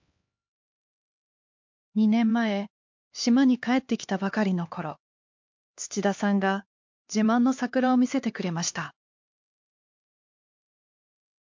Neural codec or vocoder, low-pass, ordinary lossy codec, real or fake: codec, 16 kHz, 2 kbps, X-Codec, HuBERT features, trained on LibriSpeech; 7.2 kHz; MP3, 48 kbps; fake